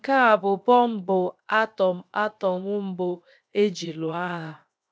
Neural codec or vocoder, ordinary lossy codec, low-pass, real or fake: codec, 16 kHz, 0.7 kbps, FocalCodec; none; none; fake